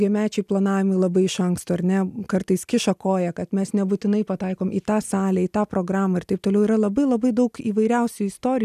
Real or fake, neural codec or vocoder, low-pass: real; none; 14.4 kHz